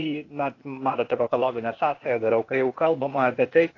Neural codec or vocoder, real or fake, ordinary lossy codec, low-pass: codec, 16 kHz, 0.8 kbps, ZipCodec; fake; AAC, 32 kbps; 7.2 kHz